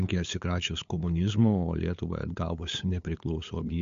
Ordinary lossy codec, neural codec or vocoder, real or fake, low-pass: MP3, 48 kbps; codec, 16 kHz, 8 kbps, FunCodec, trained on LibriTTS, 25 frames a second; fake; 7.2 kHz